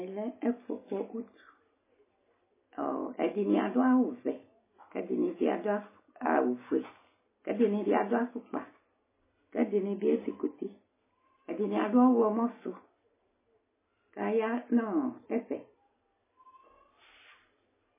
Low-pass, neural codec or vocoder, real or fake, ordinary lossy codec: 3.6 kHz; none; real; MP3, 16 kbps